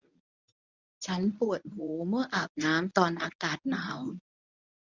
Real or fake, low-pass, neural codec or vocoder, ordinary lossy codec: fake; 7.2 kHz; codec, 24 kHz, 0.9 kbps, WavTokenizer, medium speech release version 1; none